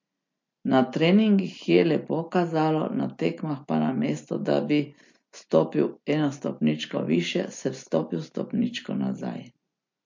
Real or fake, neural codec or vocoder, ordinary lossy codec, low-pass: real; none; MP3, 48 kbps; 7.2 kHz